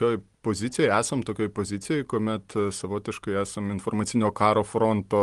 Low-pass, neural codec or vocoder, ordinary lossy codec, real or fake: 10.8 kHz; none; Opus, 24 kbps; real